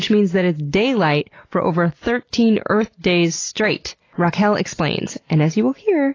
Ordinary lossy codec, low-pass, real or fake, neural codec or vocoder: AAC, 32 kbps; 7.2 kHz; real; none